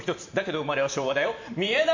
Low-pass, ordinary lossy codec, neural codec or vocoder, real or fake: 7.2 kHz; MP3, 48 kbps; vocoder, 44.1 kHz, 128 mel bands every 512 samples, BigVGAN v2; fake